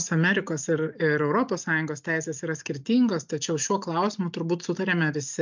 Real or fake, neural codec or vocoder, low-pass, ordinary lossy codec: real; none; 7.2 kHz; MP3, 64 kbps